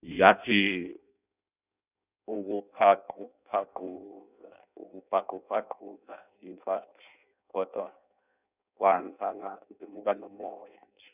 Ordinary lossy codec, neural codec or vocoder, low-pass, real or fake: none; codec, 16 kHz in and 24 kHz out, 0.6 kbps, FireRedTTS-2 codec; 3.6 kHz; fake